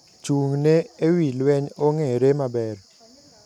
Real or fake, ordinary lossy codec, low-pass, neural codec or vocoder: real; none; 19.8 kHz; none